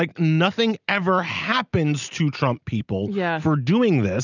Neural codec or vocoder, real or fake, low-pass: none; real; 7.2 kHz